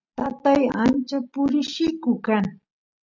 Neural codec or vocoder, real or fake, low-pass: none; real; 7.2 kHz